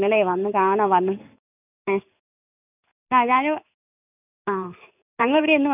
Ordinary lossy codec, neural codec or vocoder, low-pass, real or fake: none; codec, 16 kHz in and 24 kHz out, 1 kbps, XY-Tokenizer; 3.6 kHz; fake